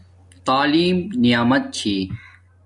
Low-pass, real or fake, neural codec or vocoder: 10.8 kHz; real; none